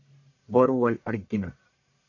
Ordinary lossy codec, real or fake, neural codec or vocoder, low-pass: AAC, 48 kbps; fake; codec, 44.1 kHz, 1.7 kbps, Pupu-Codec; 7.2 kHz